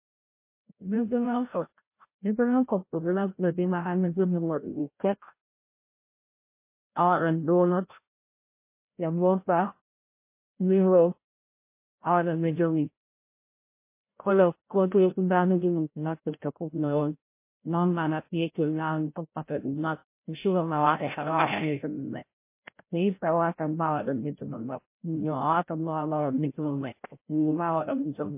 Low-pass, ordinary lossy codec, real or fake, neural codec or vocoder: 3.6 kHz; MP3, 24 kbps; fake; codec, 16 kHz, 0.5 kbps, FreqCodec, larger model